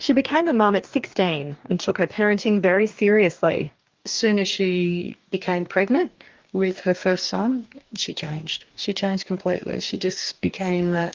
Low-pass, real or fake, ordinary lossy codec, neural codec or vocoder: 7.2 kHz; fake; Opus, 32 kbps; codec, 44.1 kHz, 2.6 kbps, DAC